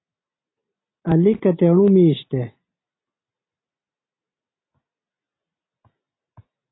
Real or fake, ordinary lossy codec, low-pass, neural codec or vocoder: real; AAC, 16 kbps; 7.2 kHz; none